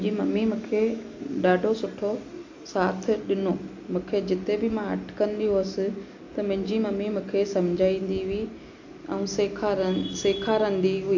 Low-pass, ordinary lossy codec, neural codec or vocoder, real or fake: 7.2 kHz; AAC, 48 kbps; none; real